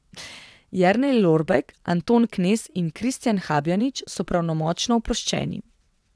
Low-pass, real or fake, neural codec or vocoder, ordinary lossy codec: none; fake; vocoder, 22.05 kHz, 80 mel bands, WaveNeXt; none